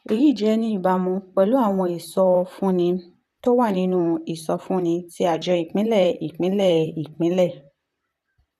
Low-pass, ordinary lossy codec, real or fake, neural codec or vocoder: 14.4 kHz; none; fake; vocoder, 44.1 kHz, 128 mel bands, Pupu-Vocoder